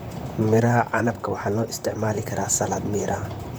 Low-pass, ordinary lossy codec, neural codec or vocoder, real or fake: none; none; vocoder, 44.1 kHz, 128 mel bands, Pupu-Vocoder; fake